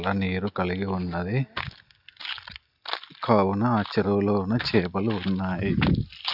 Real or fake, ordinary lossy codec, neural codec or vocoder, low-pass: real; none; none; 5.4 kHz